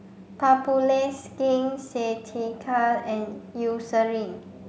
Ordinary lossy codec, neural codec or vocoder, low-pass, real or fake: none; none; none; real